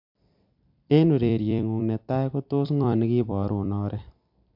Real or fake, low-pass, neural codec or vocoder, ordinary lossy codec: fake; 5.4 kHz; vocoder, 44.1 kHz, 128 mel bands every 256 samples, BigVGAN v2; MP3, 48 kbps